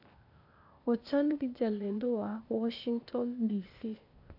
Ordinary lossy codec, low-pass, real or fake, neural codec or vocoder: none; 5.4 kHz; fake; codec, 16 kHz, 0.8 kbps, ZipCodec